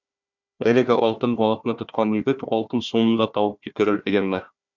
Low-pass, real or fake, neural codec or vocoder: 7.2 kHz; fake; codec, 16 kHz, 1 kbps, FunCodec, trained on Chinese and English, 50 frames a second